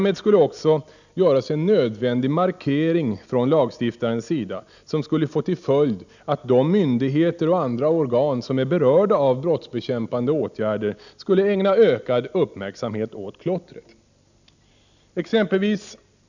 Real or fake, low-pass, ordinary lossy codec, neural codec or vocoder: real; 7.2 kHz; none; none